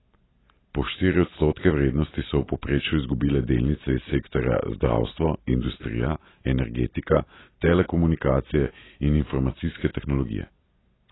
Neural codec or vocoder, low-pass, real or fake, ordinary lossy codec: none; 7.2 kHz; real; AAC, 16 kbps